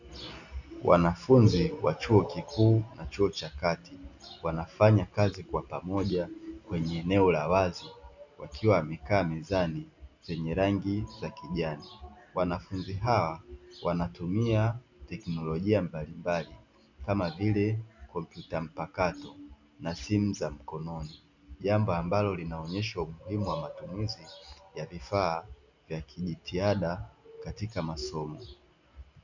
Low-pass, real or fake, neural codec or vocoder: 7.2 kHz; real; none